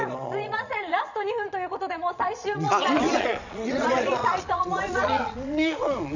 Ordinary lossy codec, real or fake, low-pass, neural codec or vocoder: none; fake; 7.2 kHz; vocoder, 22.05 kHz, 80 mel bands, Vocos